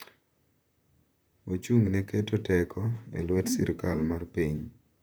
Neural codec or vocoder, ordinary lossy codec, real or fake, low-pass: vocoder, 44.1 kHz, 128 mel bands, Pupu-Vocoder; none; fake; none